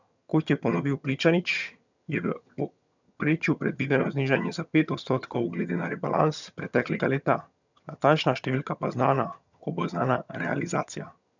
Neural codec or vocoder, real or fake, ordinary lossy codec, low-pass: vocoder, 22.05 kHz, 80 mel bands, HiFi-GAN; fake; none; 7.2 kHz